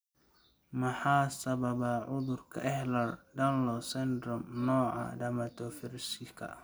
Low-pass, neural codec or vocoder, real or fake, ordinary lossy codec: none; none; real; none